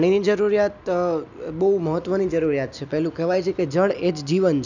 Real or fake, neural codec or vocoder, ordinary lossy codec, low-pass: real; none; none; 7.2 kHz